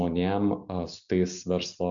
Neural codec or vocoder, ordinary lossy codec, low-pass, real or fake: none; MP3, 64 kbps; 7.2 kHz; real